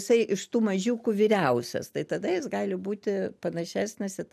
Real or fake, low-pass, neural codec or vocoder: real; 14.4 kHz; none